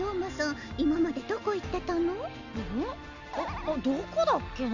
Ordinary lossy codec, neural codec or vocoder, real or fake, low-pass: none; none; real; 7.2 kHz